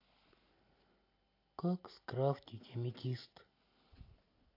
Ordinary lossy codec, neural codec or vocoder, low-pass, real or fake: AAC, 32 kbps; none; 5.4 kHz; real